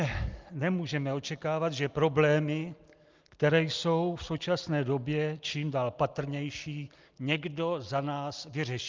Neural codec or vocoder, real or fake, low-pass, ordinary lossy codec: none; real; 7.2 kHz; Opus, 24 kbps